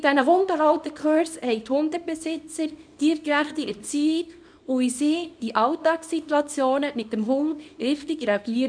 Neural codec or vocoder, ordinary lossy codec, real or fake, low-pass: codec, 24 kHz, 0.9 kbps, WavTokenizer, small release; none; fake; 9.9 kHz